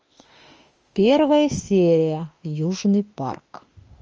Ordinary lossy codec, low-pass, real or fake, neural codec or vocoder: Opus, 24 kbps; 7.2 kHz; fake; autoencoder, 48 kHz, 32 numbers a frame, DAC-VAE, trained on Japanese speech